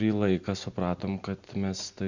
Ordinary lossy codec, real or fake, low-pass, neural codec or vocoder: Opus, 64 kbps; real; 7.2 kHz; none